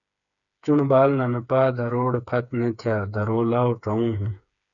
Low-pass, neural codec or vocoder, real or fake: 7.2 kHz; codec, 16 kHz, 4 kbps, FreqCodec, smaller model; fake